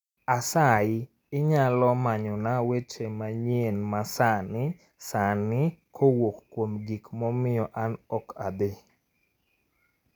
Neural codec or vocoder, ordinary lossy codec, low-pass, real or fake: none; none; 19.8 kHz; real